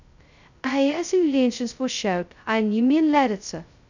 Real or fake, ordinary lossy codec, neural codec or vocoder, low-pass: fake; none; codec, 16 kHz, 0.2 kbps, FocalCodec; 7.2 kHz